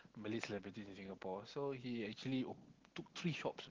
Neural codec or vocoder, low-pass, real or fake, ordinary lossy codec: none; 7.2 kHz; real; Opus, 16 kbps